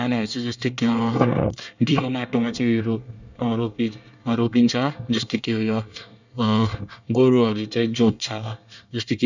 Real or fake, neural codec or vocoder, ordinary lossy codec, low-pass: fake; codec, 24 kHz, 1 kbps, SNAC; none; 7.2 kHz